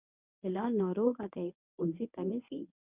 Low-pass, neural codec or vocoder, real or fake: 3.6 kHz; codec, 24 kHz, 0.9 kbps, WavTokenizer, medium speech release version 1; fake